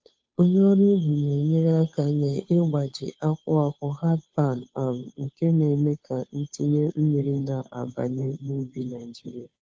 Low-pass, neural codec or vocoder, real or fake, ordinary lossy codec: none; codec, 16 kHz, 2 kbps, FunCodec, trained on Chinese and English, 25 frames a second; fake; none